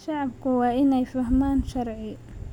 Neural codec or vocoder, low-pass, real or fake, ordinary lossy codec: none; 19.8 kHz; real; none